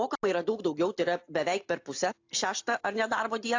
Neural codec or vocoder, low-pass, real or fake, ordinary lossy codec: none; 7.2 kHz; real; AAC, 48 kbps